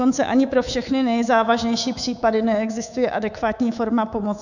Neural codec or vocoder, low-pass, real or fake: codec, 24 kHz, 3.1 kbps, DualCodec; 7.2 kHz; fake